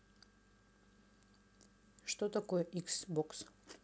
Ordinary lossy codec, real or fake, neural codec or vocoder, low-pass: none; real; none; none